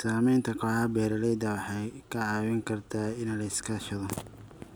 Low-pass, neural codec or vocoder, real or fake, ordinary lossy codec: none; none; real; none